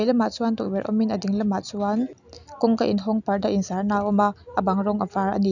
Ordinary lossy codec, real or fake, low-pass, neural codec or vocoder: none; real; 7.2 kHz; none